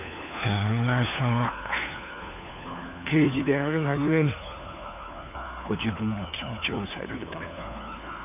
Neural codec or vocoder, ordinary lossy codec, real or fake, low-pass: codec, 16 kHz, 4 kbps, FunCodec, trained on LibriTTS, 50 frames a second; none; fake; 3.6 kHz